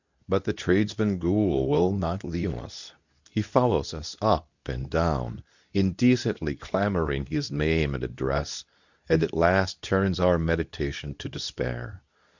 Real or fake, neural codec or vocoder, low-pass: fake; codec, 24 kHz, 0.9 kbps, WavTokenizer, medium speech release version 2; 7.2 kHz